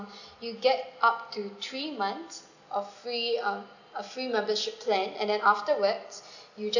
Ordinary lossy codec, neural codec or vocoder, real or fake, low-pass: none; none; real; 7.2 kHz